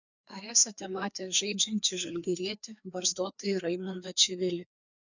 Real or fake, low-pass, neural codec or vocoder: fake; 7.2 kHz; codec, 16 kHz, 2 kbps, FreqCodec, larger model